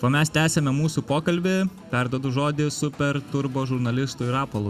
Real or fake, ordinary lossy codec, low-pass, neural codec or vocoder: real; Opus, 64 kbps; 14.4 kHz; none